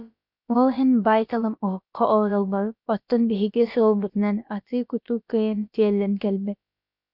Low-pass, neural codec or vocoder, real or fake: 5.4 kHz; codec, 16 kHz, about 1 kbps, DyCAST, with the encoder's durations; fake